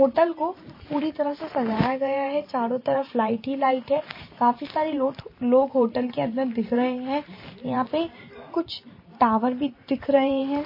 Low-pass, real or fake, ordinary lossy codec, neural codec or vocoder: 5.4 kHz; fake; MP3, 24 kbps; vocoder, 44.1 kHz, 128 mel bands every 512 samples, BigVGAN v2